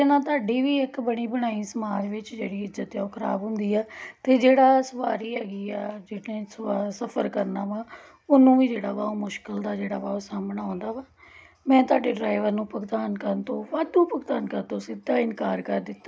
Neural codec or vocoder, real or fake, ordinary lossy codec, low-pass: none; real; none; none